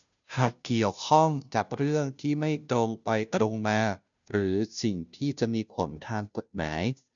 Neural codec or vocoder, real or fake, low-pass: codec, 16 kHz, 0.5 kbps, FunCodec, trained on Chinese and English, 25 frames a second; fake; 7.2 kHz